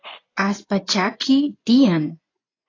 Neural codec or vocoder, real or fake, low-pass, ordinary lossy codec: vocoder, 24 kHz, 100 mel bands, Vocos; fake; 7.2 kHz; AAC, 32 kbps